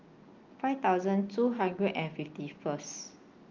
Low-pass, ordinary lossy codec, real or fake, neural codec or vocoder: 7.2 kHz; Opus, 24 kbps; real; none